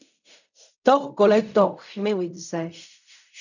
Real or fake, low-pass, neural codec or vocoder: fake; 7.2 kHz; codec, 16 kHz in and 24 kHz out, 0.4 kbps, LongCat-Audio-Codec, fine tuned four codebook decoder